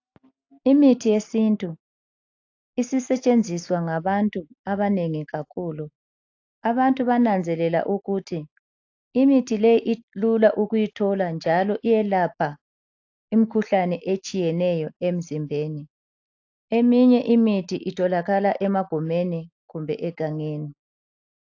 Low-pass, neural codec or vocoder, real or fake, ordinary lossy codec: 7.2 kHz; none; real; AAC, 48 kbps